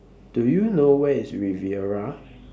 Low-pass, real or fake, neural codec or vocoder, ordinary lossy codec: none; real; none; none